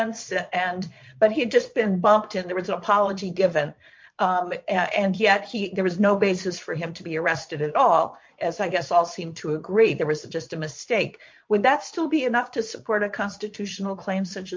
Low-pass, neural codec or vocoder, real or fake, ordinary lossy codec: 7.2 kHz; vocoder, 22.05 kHz, 80 mel bands, Vocos; fake; MP3, 48 kbps